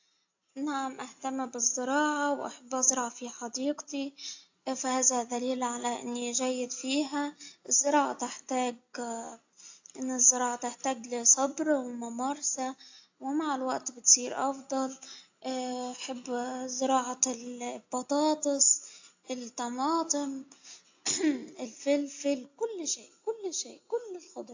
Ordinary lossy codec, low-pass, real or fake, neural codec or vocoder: AAC, 48 kbps; 7.2 kHz; real; none